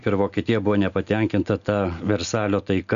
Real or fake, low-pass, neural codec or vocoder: real; 7.2 kHz; none